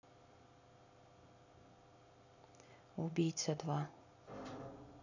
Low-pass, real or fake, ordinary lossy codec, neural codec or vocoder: 7.2 kHz; real; none; none